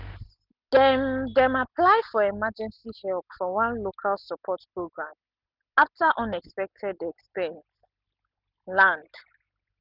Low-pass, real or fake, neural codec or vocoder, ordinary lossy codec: 5.4 kHz; real; none; none